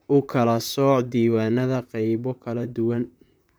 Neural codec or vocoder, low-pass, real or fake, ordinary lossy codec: vocoder, 44.1 kHz, 128 mel bands, Pupu-Vocoder; none; fake; none